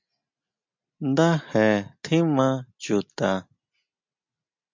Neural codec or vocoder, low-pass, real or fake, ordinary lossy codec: none; 7.2 kHz; real; MP3, 64 kbps